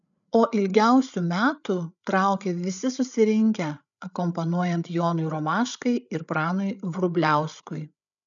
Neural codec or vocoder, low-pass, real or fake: codec, 16 kHz, 8 kbps, FreqCodec, larger model; 7.2 kHz; fake